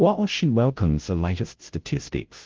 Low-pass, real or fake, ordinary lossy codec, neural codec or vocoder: 7.2 kHz; fake; Opus, 16 kbps; codec, 16 kHz, 0.5 kbps, FunCodec, trained on Chinese and English, 25 frames a second